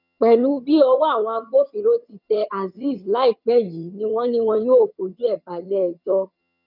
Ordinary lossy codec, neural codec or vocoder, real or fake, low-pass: none; vocoder, 22.05 kHz, 80 mel bands, HiFi-GAN; fake; 5.4 kHz